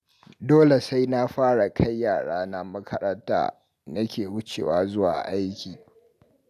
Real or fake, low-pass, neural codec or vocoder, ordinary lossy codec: real; 14.4 kHz; none; none